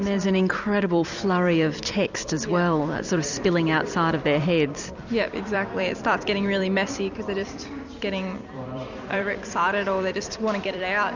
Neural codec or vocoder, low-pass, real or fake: vocoder, 44.1 kHz, 128 mel bands every 256 samples, BigVGAN v2; 7.2 kHz; fake